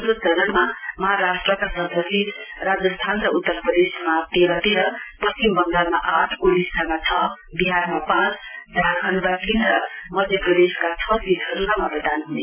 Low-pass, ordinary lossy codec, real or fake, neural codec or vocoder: 3.6 kHz; none; real; none